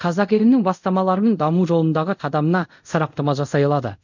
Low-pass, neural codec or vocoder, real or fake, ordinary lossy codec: 7.2 kHz; codec, 24 kHz, 0.5 kbps, DualCodec; fake; none